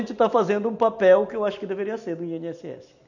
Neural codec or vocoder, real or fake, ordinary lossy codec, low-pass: none; real; none; 7.2 kHz